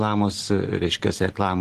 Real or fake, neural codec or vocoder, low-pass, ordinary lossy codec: real; none; 14.4 kHz; Opus, 16 kbps